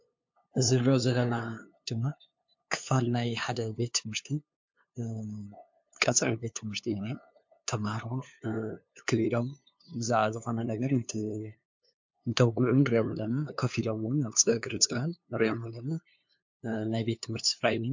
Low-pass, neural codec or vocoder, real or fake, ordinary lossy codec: 7.2 kHz; codec, 16 kHz, 2 kbps, FunCodec, trained on LibriTTS, 25 frames a second; fake; MP3, 48 kbps